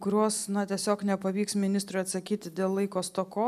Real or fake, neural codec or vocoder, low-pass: real; none; 14.4 kHz